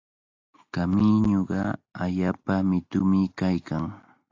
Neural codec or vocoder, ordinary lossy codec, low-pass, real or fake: none; AAC, 48 kbps; 7.2 kHz; real